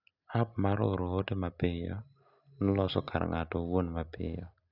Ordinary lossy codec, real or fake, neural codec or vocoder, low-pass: none; real; none; 5.4 kHz